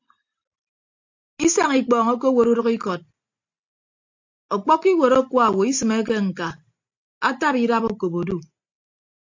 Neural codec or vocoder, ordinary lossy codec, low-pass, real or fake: none; AAC, 48 kbps; 7.2 kHz; real